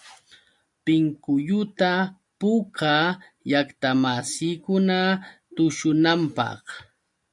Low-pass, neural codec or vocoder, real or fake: 10.8 kHz; none; real